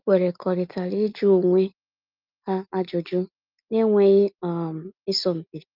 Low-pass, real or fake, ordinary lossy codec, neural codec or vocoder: 5.4 kHz; real; Opus, 24 kbps; none